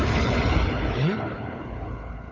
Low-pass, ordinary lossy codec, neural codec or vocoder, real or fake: 7.2 kHz; AAC, 32 kbps; codec, 16 kHz, 4 kbps, FunCodec, trained on Chinese and English, 50 frames a second; fake